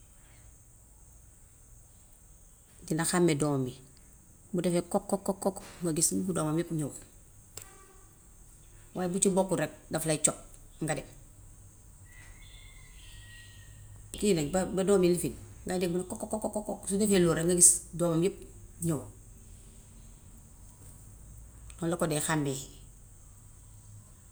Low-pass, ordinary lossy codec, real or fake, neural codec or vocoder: none; none; real; none